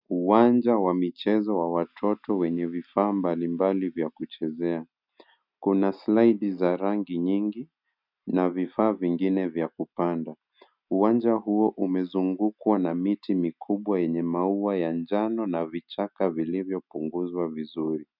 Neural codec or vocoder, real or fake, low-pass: none; real; 5.4 kHz